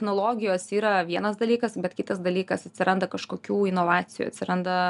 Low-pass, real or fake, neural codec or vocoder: 10.8 kHz; real; none